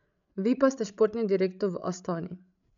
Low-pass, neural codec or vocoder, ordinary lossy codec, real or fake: 7.2 kHz; codec, 16 kHz, 16 kbps, FreqCodec, larger model; none; fake